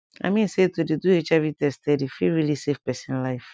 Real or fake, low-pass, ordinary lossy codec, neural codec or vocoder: real; none; none; none